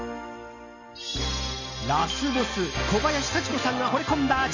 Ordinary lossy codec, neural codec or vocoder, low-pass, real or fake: none; none; 7.2 kHz; real